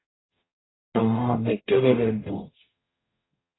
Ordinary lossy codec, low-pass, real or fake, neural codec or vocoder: AAC, 16 kbps; 7.2 kHz; fake; codec, 44.1 kHz, 0.9 kbps, DAC